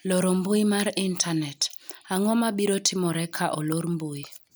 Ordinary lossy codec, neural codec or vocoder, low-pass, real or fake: none; none; none; real